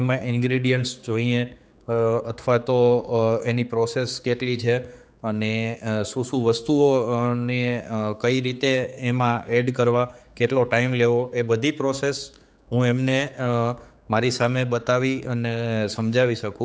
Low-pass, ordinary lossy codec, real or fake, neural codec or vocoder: none; none; fake; codec, 16 kHz, 4 kbps, X-Codec, HuBERT features, trained on general audio